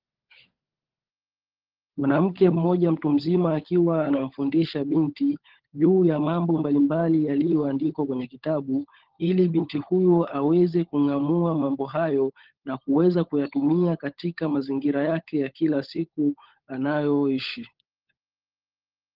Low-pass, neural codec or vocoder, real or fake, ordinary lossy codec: 5.4 kHz; codec, 16 kHz, 16 kbps, FunCodec, trained on LibriTTS, 50 frames a second; fake; Opus, 16 kbps